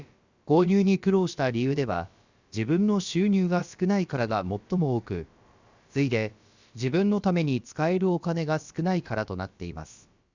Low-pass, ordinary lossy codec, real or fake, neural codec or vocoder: 7.2 kHz; Opus, 64 kbps; fake; codec, 16 kHz, about 1 kbps, DyCAST, with the encoder's durations